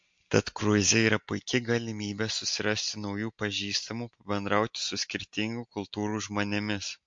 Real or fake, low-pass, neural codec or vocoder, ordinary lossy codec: real; 9.9 kHz; none; MP3, 48 kbps